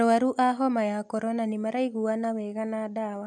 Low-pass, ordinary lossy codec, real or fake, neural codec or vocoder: none; none; real; none